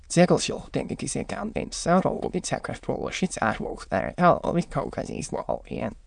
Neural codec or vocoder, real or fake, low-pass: autoencoder, 22.05 kHz, a latent of 192 numbers a frame, VITS, trained on many speakers; fake; 9.9 kHz